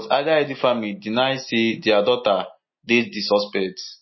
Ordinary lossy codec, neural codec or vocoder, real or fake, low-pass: MP3, 24 kbps; none; real; 7.2 kHz